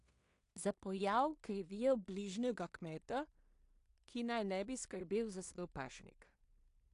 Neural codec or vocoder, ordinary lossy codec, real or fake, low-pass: codec, 16 kHz in and 24 kHz out, 0.4 kbps, LongCat-Audio-Codec, two codebook decoder; AAC, 64 kbps; fake; 10.8 kHz